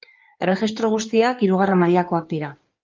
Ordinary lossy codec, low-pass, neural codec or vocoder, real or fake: Opus, 24 kbps; 7.2 kHz; codec, 16 kHz in and 24 kHz out, 2.2 kbps, FireRedTTS-2 codec; fake